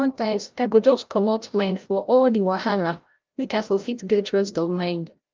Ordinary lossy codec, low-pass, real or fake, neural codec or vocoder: Opus, 32 kbps; 7.2 kHz; fake; codec, 16 kHz, 0.5 kbps, FreqCodec, larger model